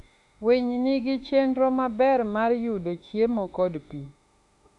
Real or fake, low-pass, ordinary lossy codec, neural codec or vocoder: fake; 10.8 kHz; none; autoencoder, 48 kHz, 32 numbers a frame, DAC-VAE, trained on Japanese speech